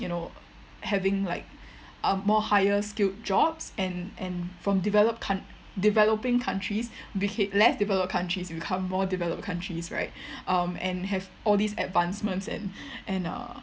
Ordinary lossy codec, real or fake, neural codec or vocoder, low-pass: none; real; none; none